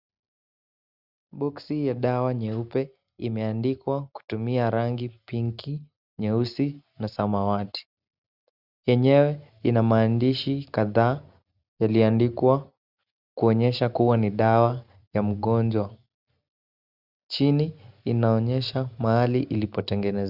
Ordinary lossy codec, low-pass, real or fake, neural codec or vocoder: Opus, 64 kbps; 5.4 kHz; real; none